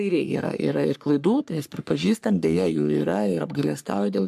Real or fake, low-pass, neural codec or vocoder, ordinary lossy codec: fake; 14.4 kHz; codec, 44.1 kHz, 3.4 kbps, Pupu-Codec; AAC, 96 kbps